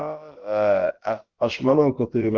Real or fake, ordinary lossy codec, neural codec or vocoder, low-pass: fake; Opus, 16 kbps; codec, 16 kHz, about 1 kbps, DyCAST, with the encoder's durations; 7.2 kHz